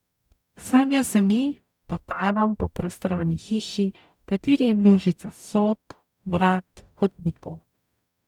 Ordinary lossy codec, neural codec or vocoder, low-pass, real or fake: none; codec, 44.1 kHz, 0.9 kbps, DAC; 19.8 kHz; fake